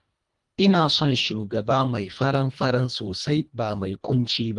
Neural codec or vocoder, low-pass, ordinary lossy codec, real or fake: codec, 24 kHz, 1.5 kbps, HILCodec; 10.8 kHz; Opus, 32 kbps; fake